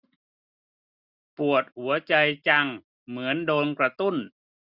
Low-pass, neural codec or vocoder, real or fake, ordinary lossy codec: 5.4 kHz; none; real; none